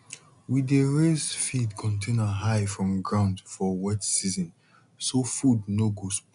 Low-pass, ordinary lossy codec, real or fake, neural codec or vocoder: 10.8 kHz; none; real; none